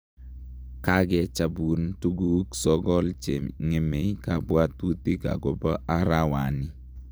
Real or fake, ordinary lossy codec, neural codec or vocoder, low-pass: fake; none; vocoder, 44.1 kHz, 128 mel bands every 256 samples, BigVGAN v2; none